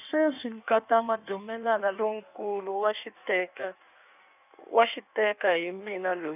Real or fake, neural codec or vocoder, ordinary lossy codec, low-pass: fake; codec, 16 kHz in and 24 kHz out, 1.1 kbps, FireRedTTS-2 codec; none; 3.6 kHz